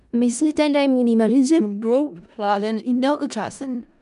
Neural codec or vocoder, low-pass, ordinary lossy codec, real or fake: codec, 16 kHz in and 24 kHz out, 0.4 kbps, LongCat-Audio-Codec, four codebook decoder; 10.8 kHz; none; fake